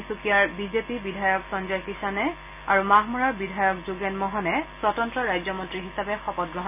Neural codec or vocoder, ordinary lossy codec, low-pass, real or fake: none; none; 3.6 kHz; real